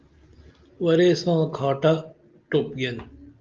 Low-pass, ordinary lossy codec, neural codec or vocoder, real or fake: 7.2 kHz; Opus, 24 kbps; none; real